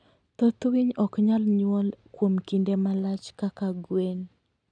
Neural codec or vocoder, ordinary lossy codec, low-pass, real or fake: none; none; 9.9 kHz; real